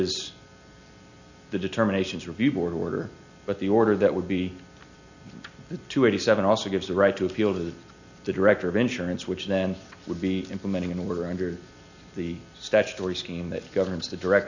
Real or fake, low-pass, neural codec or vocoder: real; 7.2 kHz; none